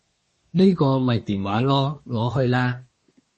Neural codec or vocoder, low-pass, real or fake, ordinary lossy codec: codec, 24 kHz, 1 kbps, SNAC; 10.8 kHz; fake; MP3, 32 kbps